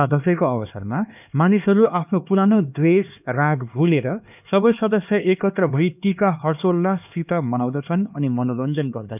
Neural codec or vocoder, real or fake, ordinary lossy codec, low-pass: codec, 16 kHz, 2 kbps, X-Codec, HuBERT features, trained on LibriSpeech; fake; none; 3.6 kHz